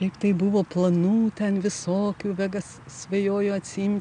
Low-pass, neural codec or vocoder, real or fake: 9.9 kHz; none; real